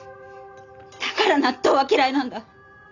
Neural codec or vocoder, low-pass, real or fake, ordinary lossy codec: none; 7.2 kHz; real; none